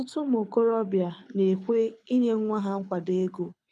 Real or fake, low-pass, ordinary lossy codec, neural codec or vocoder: fake; none; none; codec, 24 kHz, 6 kbps, HILCodec